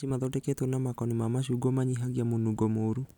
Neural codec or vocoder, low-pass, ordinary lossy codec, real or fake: none; 19.8 kHz; none; real